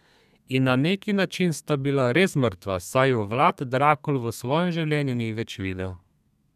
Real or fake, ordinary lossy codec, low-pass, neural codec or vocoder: fake; none; 14.4 kHz; codec, 32 kHz, 1.9 kbps, SNAC